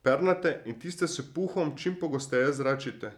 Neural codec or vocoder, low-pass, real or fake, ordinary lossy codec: vocoder, 44.1 kHz, 128 mel bands every 256 samples, BigVGAN v2; 19.8 kHz; fake; none